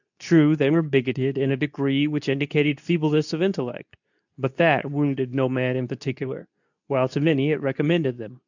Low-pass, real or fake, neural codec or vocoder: 7.2 kHz; fake; codec, 24 kHz, 0.9 kbps, WavTokenizer, medium speech release version 2